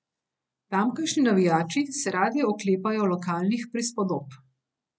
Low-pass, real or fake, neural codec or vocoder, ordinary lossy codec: none; real; none; none